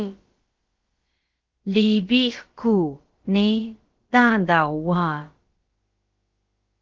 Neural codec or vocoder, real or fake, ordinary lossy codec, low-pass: codec, 16 kHz, about 1 kbps, DyCAST, with the encoder's durations; fake; Opus, 16 kbps; 7.2 kHz